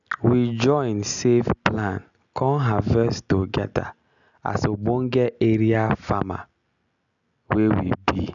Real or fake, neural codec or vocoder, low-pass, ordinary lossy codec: real; none; 7.2 kHz; none